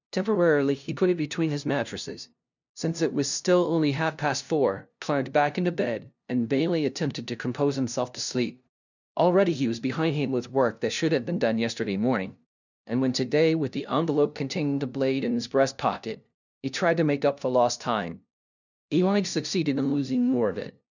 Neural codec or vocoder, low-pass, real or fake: codec, 16 kHz, 0.5 kbps, FunCodec, trained on LibriTTS, 25 frames a second; 7.2 kHz; fake